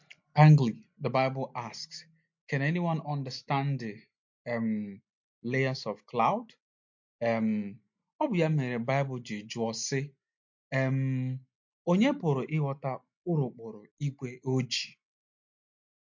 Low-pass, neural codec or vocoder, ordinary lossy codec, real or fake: 7.2 kHz; none; MP3, 48 kbps; real